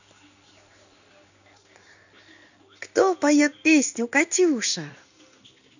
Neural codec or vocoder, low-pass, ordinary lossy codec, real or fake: codec, 16 kHz in and 24 kHz out, 1 kbps, XY-Tokenizer; 7.2 kHz; none; fake